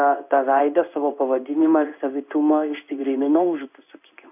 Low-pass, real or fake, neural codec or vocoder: 3.6 kHz; fake; codec, 16 kHz in and 24 kHz out, 1 kbps, XY-Tokenizer